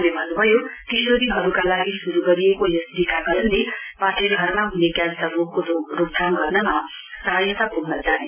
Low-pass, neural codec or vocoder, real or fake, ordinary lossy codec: 3.6 kHz; none; real; none